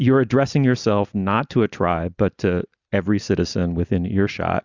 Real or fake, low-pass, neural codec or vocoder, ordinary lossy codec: real; 7.2 kHz; none; Opus, 64 kbps